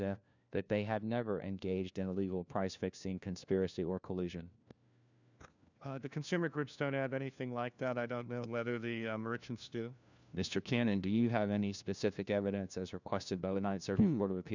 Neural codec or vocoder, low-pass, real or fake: codec, 16 kHz, 1 kbps, FunCodec, trained on LibriTTS, 50 frames a second; 7.2 kHz; fake